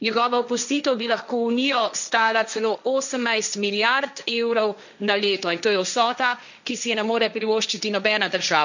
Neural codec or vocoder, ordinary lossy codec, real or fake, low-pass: codec, 16 kHz, 1.1 kbps, Voila-Tokenizer; none; fake; 7.2 kHz